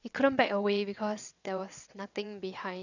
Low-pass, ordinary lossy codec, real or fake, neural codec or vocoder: 7.2 kHz; none; fake; vocoder, 22.05 kHz, 80 mel bands, WaveNeXt